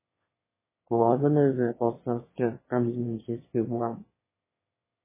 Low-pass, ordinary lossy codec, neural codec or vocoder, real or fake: 3.6 kHz; MP3, 16 kbps; autoencoder, 22.05 kHz, a latent of 192 numbers a frame, VITS, trained on one speaker; fake